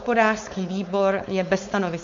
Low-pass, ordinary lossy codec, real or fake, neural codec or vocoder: 7.2 kHz; MP3, 48 kbps; fake; codec, 16 kHz, 4.8 kbps, FACodec